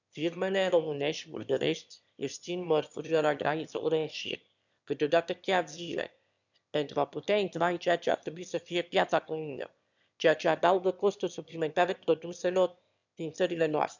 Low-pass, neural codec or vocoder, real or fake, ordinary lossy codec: 7.2 kHz; autoencoder, 22.05 kHz, a latent of 192 numbers a frame, VITS, trained on one speaker; fake; none